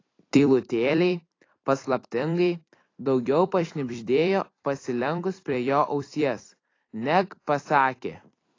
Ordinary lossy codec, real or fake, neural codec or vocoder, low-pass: AAC, 32 kbps; fake; vocoder, 44.1 kHz, 128 mel bands every 256 samples, BigVGAN v2; 7.2 kHz